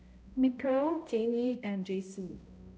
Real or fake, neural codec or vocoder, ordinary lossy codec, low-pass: fake; codec, 16 kHz, 0.5 kbps, X-Codec, HuBERT features, trained on balanced general audio; none; none